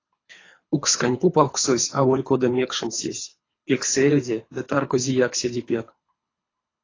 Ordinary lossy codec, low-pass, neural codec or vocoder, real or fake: AAC, 32 kbps; 7.2 kHz; codec, 24 kHz, 3 kbps, HILCodec; fake